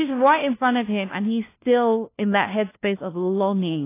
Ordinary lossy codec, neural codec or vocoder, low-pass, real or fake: AAC, 24 kbps; codec, 16 kHz, 1 kbps, FunCodec, trained on LibriTTS, 50 frames a second; 3.6 kHz; fake